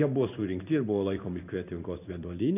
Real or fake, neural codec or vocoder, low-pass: fake; codec, 16 kHz in and 24 kHz out, 1 kbps, XY-Tokenizer; 3.6 kHz